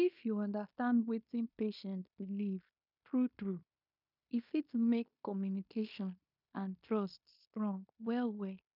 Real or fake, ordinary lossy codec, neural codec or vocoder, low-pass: fake; none; codec, 16 kHz in and 24 kHz out, 0.9 kbps, LongCat-Audio-Codec, fine tuned four codebook decoder; 5.4 kHz